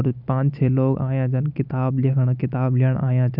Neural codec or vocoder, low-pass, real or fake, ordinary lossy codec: none; 5.4 kHz; real; none